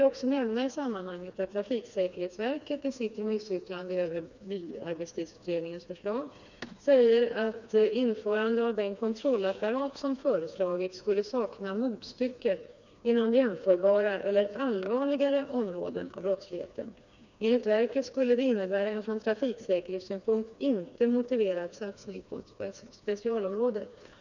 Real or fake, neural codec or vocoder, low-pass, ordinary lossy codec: fake; codec, 16 kHz, 2 kbps, FreqCodec, smaller model; 7.2 kHz; none